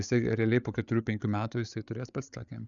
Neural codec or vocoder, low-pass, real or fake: codec, 16 kHz, 8 kbps, FreqCodec, larger model; 7.2 kHz; fake